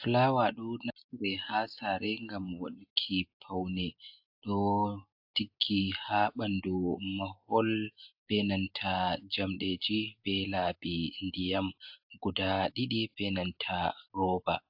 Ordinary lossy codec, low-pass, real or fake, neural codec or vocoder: AAC, 48 kbps; 5.4 kHz; real; none